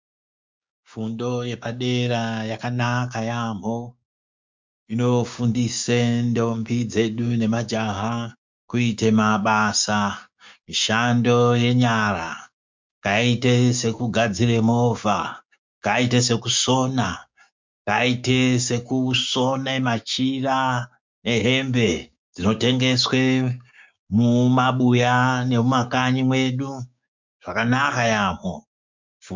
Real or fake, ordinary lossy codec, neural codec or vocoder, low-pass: fake; MP3, 64 kbps; codec, 16 kHz, 6 kbps, DAC; 7.2 kHz